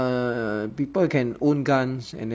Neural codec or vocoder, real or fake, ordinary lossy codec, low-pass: none; real; none; none